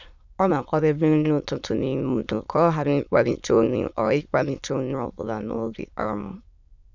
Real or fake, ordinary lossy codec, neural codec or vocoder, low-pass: fake; none; autoencoder, 22.05 kHz, a latent of 192 numbers a frame, VITS, trained on many speakers; 7.2 kHz